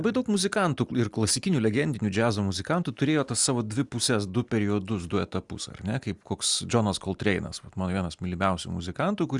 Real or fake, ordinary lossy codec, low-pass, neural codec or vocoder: real; Opus, 64 kbps; 10.8 kHz; none